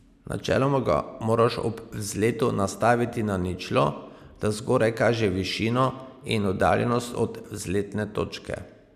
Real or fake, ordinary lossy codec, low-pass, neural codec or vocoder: real; none; 14.4 kHz; none